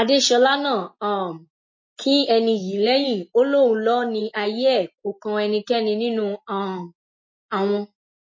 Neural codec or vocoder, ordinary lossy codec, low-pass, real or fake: none; MP3, 32 kbps; 7.2 kHz; real